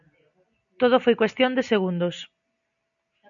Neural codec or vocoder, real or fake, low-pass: none; real; 7.2 kHz